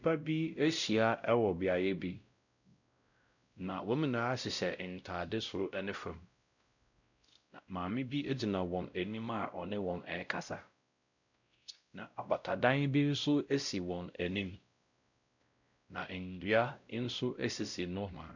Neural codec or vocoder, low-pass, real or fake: codec, 16 kHz, 0.5 kbps, X-Codec, WavLM features, trained on Multilingual LibriSpeech; 7.2 kHz; fake